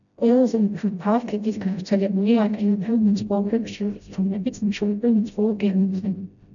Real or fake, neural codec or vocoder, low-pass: fake; codec, 16 kHz, 0.5 kbps, FreqCodec, smaller model; 7.2 kHz